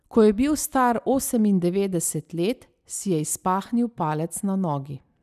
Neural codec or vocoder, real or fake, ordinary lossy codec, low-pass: none; real; none; 14.4 kHz